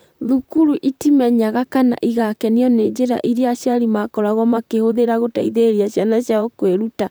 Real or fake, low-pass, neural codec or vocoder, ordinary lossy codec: fake; none; vocoder, 44.1 kHz, 128 mel bands, Pupu-Vocoder; none